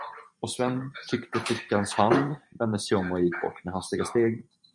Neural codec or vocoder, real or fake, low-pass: vocoder, 44.1 kHz, 128 mel bands every 512 samples, BigVGAN v2; fake; 10.8 kHz